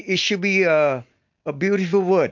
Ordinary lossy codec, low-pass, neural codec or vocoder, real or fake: MP3, 64 kbps; 7.2 kHz; none; real